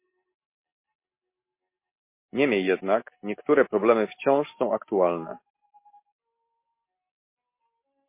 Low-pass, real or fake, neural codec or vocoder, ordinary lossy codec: 3.6 kHz; real; none; MP3, 24 kbps